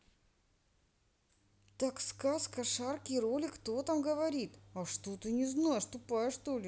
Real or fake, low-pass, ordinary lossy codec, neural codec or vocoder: real; none; none; none